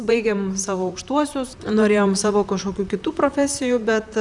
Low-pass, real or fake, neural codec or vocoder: 10.8 kHz; fake; vocoder, 44.1 kHz, 128 mel bands, Pupu-Vocoder